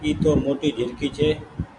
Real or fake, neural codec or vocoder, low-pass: real; none; 10.8 kHz